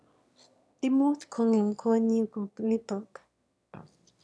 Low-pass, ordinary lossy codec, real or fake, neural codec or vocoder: none; none; fake; autoencoder, 22.05 kHz, a latent of 192 numbers a frame, VITS, trained on one speaker